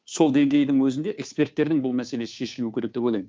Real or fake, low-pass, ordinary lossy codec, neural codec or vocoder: fake; none; none; codec, 16 kHz, 2 kbps, FunCodec, trained on Chinese and English, 25 frames a second